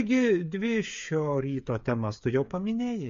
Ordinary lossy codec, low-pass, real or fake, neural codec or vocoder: MP3, 48 kbps; 7.2 kHz; fake; codec, 16 kHz, 8 kbps, FreqCodec, smaller model